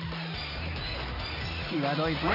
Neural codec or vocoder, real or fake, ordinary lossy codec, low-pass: none; real; AAC, 32 kbps; 5.4 kHz